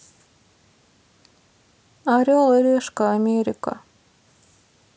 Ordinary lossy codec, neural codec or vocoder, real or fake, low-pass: none; none; real; none